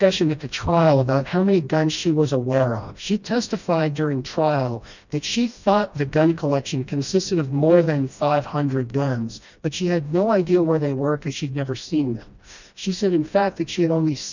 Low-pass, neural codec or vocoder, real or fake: 7.2 kHz; codec, 16 kHz, 1 kbps, FreqCodec, smaller model; fake